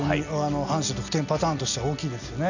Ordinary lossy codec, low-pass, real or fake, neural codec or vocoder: none; 7.2 kHz; real; none